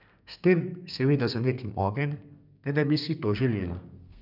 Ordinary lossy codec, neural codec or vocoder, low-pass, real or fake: none; codec, 32 kHz, 1.9 kbps, SNAC; 5.4 kHz; fake